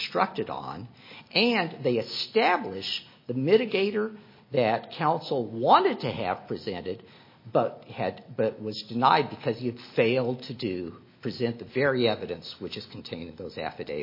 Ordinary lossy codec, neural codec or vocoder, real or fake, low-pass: MP3, 24 kbps; none; real; 5.4 kHz